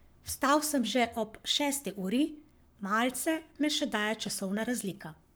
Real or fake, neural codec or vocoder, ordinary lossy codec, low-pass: fake; codec, 44.1 kHz, 7.8 kbps, Pupu-Codec; none; none